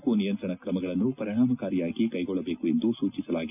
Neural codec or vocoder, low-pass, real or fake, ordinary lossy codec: none; 3.6 kHz; real; none